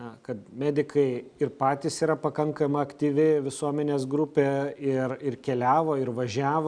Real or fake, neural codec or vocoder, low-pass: real; none; 9.9 kHz